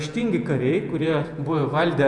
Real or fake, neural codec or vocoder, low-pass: fake; vocoder, 48 kHz, 128 mel bands, Vocos; 10.8 kHz